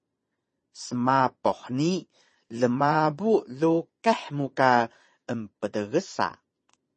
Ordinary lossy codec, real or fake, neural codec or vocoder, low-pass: MP3, 32 kbps; fake; vocoder, 22.05 kHz, 80 mel bands, Vocos; 9.9 kHz